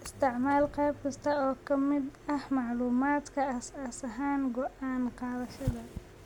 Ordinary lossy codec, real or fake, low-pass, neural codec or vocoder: MP3, 96 kbps; real; 19.8 kHz; none